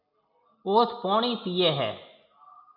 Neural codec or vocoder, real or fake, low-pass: none; real; 5.4 kHz